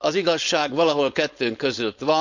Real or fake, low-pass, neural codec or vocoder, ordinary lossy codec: fake; 7.2 kHz; codec, 16 kHz, 4.8 kbps, FACodec; none